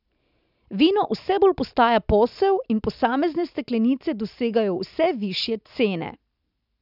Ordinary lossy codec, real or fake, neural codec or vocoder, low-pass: none; real; none; 5.4 kHz